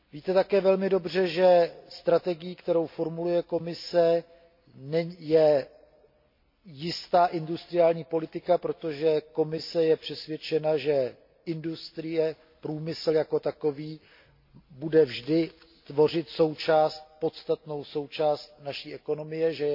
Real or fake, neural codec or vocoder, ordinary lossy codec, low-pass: real; none; MP3, 32 kbps; 5.4 kHz